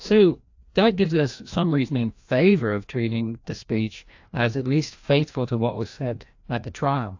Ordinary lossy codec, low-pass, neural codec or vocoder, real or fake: AAC, 48 kbps; 7.2 kHz; codec, 16 kHz, 1 kbps, FreqCodec, larger model; fake